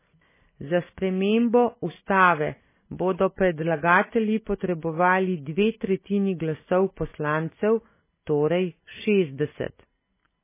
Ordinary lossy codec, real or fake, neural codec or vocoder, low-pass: MP3, 16 kbps; real; none; 3.6 kHz